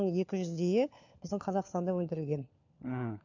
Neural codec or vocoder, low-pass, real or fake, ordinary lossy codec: codec, 16 kHz, 4 kbps, FunCodec, trained on LibriTTS, 50 frames a second; 7.2 kHz; fake; none